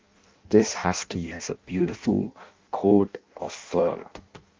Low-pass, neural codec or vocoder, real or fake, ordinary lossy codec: 7.2 kHz; codec, 16 kHz in and 24 kHz out, 0.6 kbps, FireRedTTS-2 codec; fake; Opus, 24 kbps